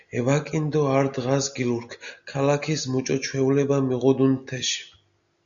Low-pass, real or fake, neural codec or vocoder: 7.2 kHz; real; none